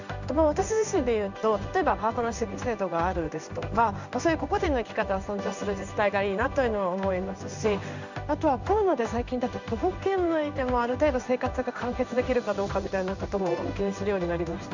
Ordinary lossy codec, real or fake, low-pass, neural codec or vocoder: none; fake; 7.2 kHz; codec, 16 kHz in and 24 kHz out, 1 kbps, XY-Tokenizer